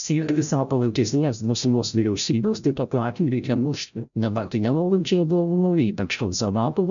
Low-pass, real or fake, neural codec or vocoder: 7.2 kHz; fake; codec, 16 kHz, 0.5 kbps, FreqCodec, larger model